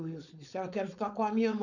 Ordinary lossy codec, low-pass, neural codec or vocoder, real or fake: none; 7.2 kHz; codec, 16 kHz, 4.8 kbps, FACodec; fake